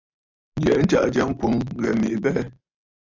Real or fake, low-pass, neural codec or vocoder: real; 7.2 kHz; none